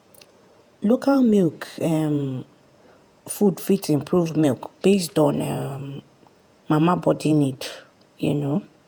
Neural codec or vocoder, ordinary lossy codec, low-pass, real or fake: vocoder, 48 kHz, 128 mel bands, Vocos; none; none; fake